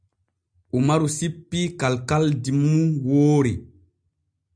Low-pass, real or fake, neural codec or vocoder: 9.9 kHz; real; none